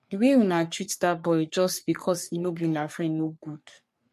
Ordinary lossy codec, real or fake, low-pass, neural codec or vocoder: MP3, 64 kbps; fake; 14.4 kHz; codec, 44.1 kHz, 3.4 kbps, Pupu-Codec